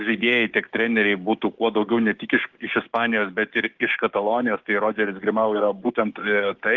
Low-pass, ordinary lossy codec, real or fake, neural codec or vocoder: 7.2 kHz; Opus, 16 kbps; fake; codec, 16 kHz, 6 kbps, DAC